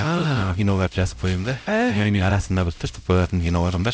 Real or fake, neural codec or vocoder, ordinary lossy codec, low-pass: fake; codec, 16 kHz, 0.5 kbps, X-Codec, HuBERT features, trained on LibriSpeech; none; none